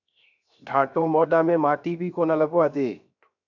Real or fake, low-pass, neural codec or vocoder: fake; 7.2 kHz; codec, 16 kHz, 0.7 kbps, FocalCodec